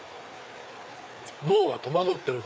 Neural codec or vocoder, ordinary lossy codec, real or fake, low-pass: codec, 16 kHz, 4 kbps, FreqCodec, larger model; none; fake; none